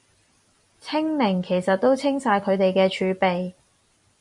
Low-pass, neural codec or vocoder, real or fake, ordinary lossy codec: 10.8 kHz; vocoder, 44.1 kHz, 128 mel bands every 256 samples, BigVGAN v2; fake; MP3, 64 kbps